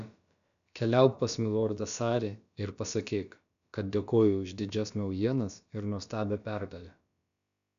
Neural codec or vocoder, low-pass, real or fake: codec, 16 kHz, about 1 kbps, DyCAST, with the encoder's durations; 7.2 kHz; fake